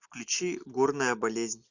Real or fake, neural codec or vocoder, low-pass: real; none; 7.2 kHz